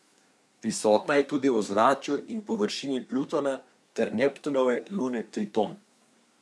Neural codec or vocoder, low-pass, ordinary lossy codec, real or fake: codec, 24 kHz, 1 kbps, SNAC; none; none; fake